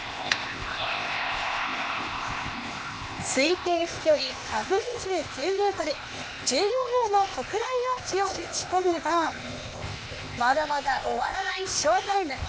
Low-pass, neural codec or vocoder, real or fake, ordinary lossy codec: none; codec, 16 kHz, 0.8 kbps, ZipCodec; fake; none